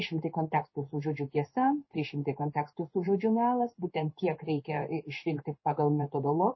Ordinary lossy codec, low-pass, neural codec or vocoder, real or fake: MP3, 24 kbps; 7.2 kHz; codec, 16 kHz in and 24 kHz out, 1 kbps, XY-Tokenizer; fake